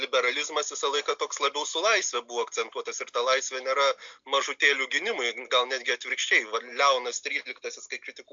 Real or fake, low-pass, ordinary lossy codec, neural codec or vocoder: real; 7.2 kHz; MP3, 64 kbps; none